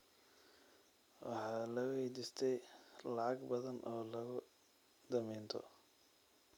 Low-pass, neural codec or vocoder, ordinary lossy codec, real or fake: 19.8 kHz; none; none; real